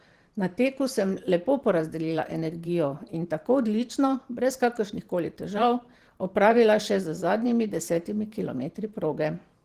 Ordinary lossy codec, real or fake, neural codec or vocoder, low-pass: Opus, 16 kbps; fake; vocoder, 44.1 kHz, 128 mel bands every 512 samples, BigVGAN v2; 14.4 kHz